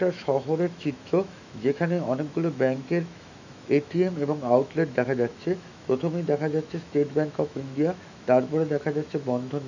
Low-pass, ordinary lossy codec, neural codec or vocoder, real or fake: 7.2 kHz; none; autoencoder, 48 kHz, 128 numbers a frame, DAC-VAE, trained on Japanese speech; fake